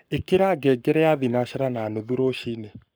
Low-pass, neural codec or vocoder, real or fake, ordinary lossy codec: none; codec, 44.1 kHz, 7.8 kbps, Pupu-Codec; fake; none